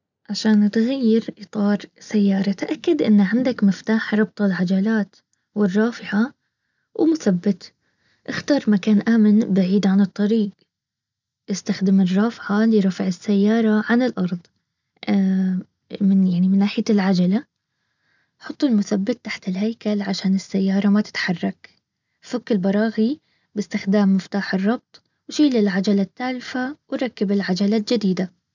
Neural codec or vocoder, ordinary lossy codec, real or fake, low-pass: none; none; real; 7.2 kHz